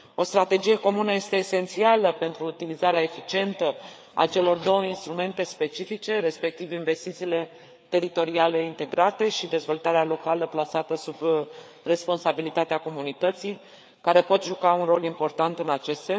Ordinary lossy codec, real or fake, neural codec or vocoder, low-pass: none; fake; codec, 16 kHz, 4 kbps, FreqCodec, larger model; none